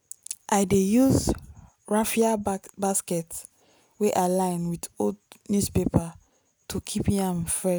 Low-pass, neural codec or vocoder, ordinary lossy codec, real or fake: none; none; none; real